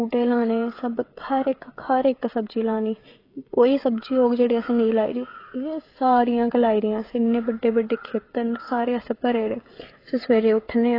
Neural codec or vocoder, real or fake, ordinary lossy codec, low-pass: codec, 16 kHz, 16 kbps, FreqCodec, smaller model; fake; AAC, 24 kbps; 5.4 kHz